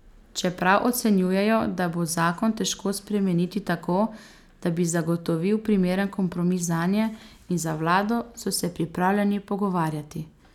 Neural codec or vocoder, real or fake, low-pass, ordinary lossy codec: none; real; 19.8 kHz; none